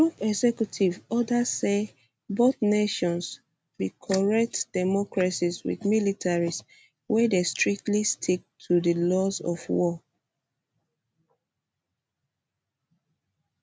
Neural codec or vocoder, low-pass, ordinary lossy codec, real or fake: none; none; none; real